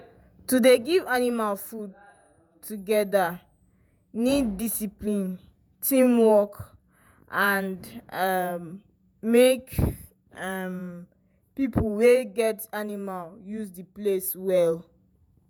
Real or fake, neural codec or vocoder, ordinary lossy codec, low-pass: fake; vocoder, 48 kHz, 128 mel bands, Vocos; none; none